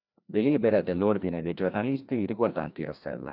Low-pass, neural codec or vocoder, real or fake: 5.4 kHz; codec, 16 kHz, 1 kbps, FreqCodec, larger model; fake